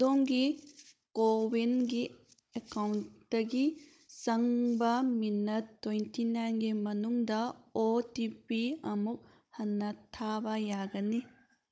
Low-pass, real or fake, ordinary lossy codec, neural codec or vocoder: none; fake; none; codec, 16 kHz, 16 kbps, FunCodec, trained on Chinese and English, 50 frames a second